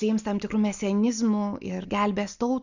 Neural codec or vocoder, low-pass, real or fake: none; 7.2 kHz; real